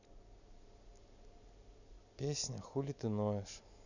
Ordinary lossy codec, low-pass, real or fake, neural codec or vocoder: none; 7.2 kHz; real; none